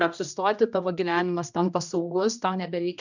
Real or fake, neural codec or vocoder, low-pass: fake; codec, 16 kHz, 1 kbps, X-Codec, HuBERT features, trained on general audio; 7.2 kHz